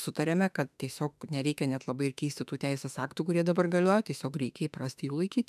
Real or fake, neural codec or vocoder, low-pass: fake; autoencoder, 48 kHz, 32 numbers a frame, DAC-VAE, trained on Japanese speech; 14.4 kHz